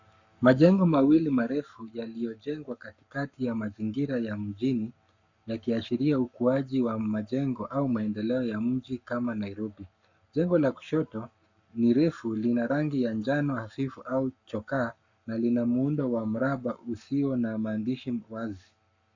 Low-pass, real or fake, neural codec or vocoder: 7.2 kHz; fake; codec, 44.1 kHz, 7.8 kbps, Pupu-Codec